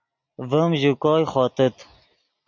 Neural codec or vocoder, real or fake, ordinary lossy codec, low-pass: none; real; MP3, 48 kbps; 7.2 kHz